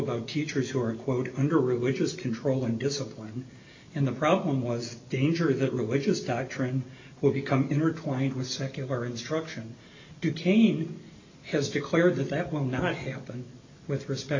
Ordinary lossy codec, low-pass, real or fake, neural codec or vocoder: MP3, 48 kbps; 7.2 kHz; real; none